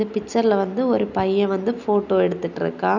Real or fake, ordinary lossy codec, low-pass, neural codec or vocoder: real; none; 7.2 kHz; none